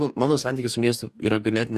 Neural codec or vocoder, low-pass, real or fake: codec, 44.1 kHz, 2.6 kbps, DAC; 14.4 kHz; fake